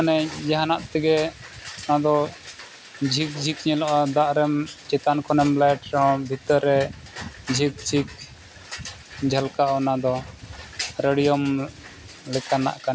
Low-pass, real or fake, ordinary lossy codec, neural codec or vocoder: none; real; none; none